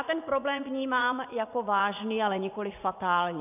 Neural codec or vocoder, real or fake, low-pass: vocoder, 44.1 kHz, 80 mel bands, Vocos; fake; 3.6 kHz